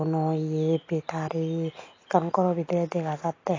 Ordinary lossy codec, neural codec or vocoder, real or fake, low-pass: AAC, 32 kbps; none; real; 7.2 kHz